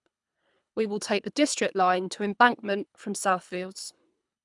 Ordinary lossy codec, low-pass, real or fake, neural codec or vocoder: none; 10.8 kHz; fake; codec, 24 kHz, 3 kbps, HILCodec